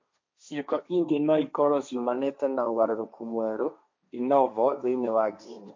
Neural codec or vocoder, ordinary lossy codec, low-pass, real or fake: codec, 16 kHz, 1.1 kbps, Voila-Tokenizer; MP3, 48 kbps; 7.2 kHz; fake